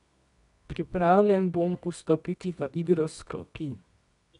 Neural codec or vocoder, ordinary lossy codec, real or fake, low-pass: codec, 24 kHz, 0.9 kbps, WavTokenizer, medium music audio release; none; fake; 10.8 kHz